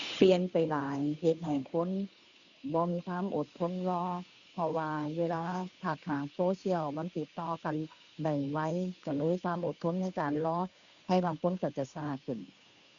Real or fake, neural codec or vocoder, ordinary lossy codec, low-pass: fake; codec, 16 kHz, 2 kbps, FunCodec, trained on Chinese and English, 25 frames a second; none; 7.2 kHz